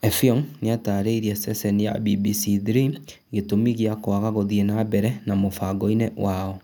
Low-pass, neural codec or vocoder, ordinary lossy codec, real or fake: 19.8 kHz; none; none; real